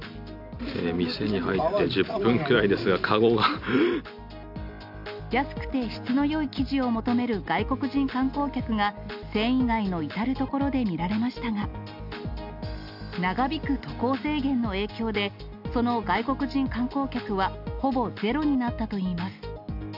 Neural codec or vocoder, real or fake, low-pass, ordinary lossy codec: none; real; 5.4 kHz; none